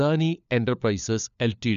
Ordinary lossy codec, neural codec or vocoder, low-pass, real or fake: none; codec, 16 kHz, 4 kbps, FunCodec, trained on LibriTTS, 50 frames a second; 7.2 kHz; fake